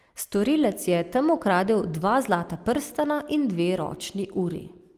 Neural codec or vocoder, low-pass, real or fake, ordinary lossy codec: none; 14.4 kHz; real; Opus, 24 kbps